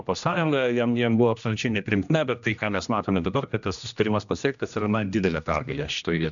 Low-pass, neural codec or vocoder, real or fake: 7.2 kHz; codec, 16 kHz, 1 kbps, X-Codec, HuBERT features, trained on general audio; fake